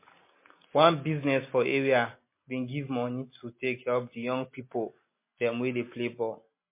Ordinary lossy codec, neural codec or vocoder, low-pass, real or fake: MP3, 24 kbps; none; 3.6 kHz; real